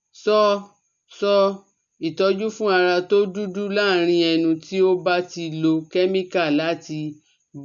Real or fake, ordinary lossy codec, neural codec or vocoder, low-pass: real; none; none; 7.2 kHz